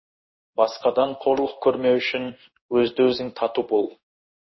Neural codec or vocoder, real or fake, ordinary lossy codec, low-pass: codec, 16 kHz in and 24 kHz out, 1 kbps, XY-Tokenizer; fake; MP3, 24 kbps; 7.2 kHz